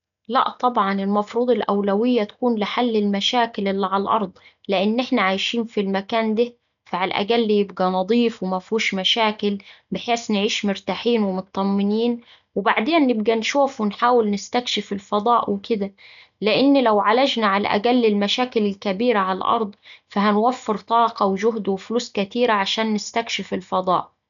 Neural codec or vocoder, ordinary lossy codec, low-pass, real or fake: none; none; 7.2 kHz; real